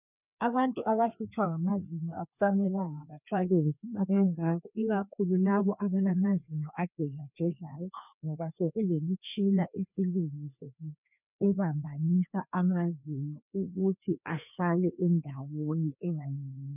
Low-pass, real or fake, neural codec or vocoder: 3.6 kHz; fake; codec, 16 kHz, 2 kbps, FreqCodec, larger model